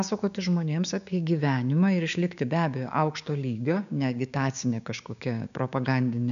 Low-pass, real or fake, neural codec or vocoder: 7.2 kHz; fake; codec, 16 kHz, 6 kbps, DAC